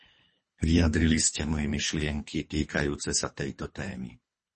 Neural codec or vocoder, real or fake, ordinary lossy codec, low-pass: codec, 24 kHz, 3 kbps, HILCodec; fake; MP3, 32 kbps; 10.8 kHz